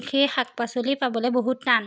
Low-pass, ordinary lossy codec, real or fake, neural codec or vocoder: none; none; real; none